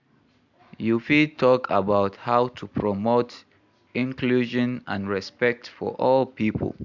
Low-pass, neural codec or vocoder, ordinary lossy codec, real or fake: 7.2 kHz; none; MP3, 64 kbps; real